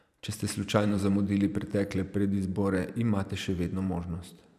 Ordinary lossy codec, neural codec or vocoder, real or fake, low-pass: none; vocoder, 44.1 kHz, 128 mel bands every 512 samples, BigVGAN v2; fake; 14.4 kHz